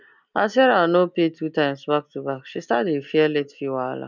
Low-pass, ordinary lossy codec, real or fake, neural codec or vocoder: 7.2 kHz; none; real; none